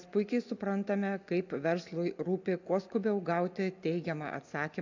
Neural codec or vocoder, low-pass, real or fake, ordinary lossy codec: none; 7.2 kHz; real; AAC, 48 kbps